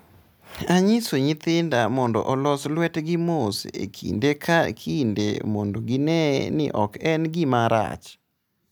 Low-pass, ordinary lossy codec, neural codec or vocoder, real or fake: none; none; none; real